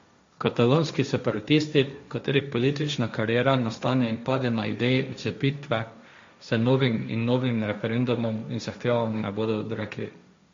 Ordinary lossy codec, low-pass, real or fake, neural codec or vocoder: MP3, 48 kbps; 7.2 kHz; fake; codec, 16 kHz, 1.1 kbps, Voila-Tokenizer